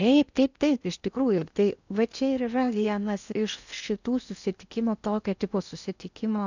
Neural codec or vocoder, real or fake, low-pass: codec, 16 kHz in and 24 kHz out, 0.6 kbps, FocalCodec, streaming, 4096 codes; fake; 7.2 kHz